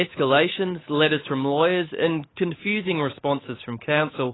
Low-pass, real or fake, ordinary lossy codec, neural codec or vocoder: 7.2 kHz; fake; AAC, 16 kbps; codec, 16 kHz, 8 kbps, FunCodec, trained on Chinese and English, 25 frames a second